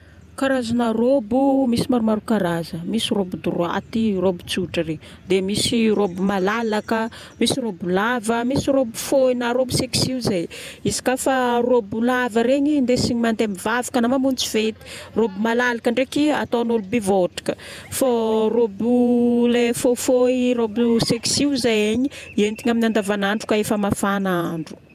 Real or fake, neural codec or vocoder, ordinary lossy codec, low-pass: fake; vocoder, 48 kHz, 128 mel bands, Vocos; none; 14.4 kHz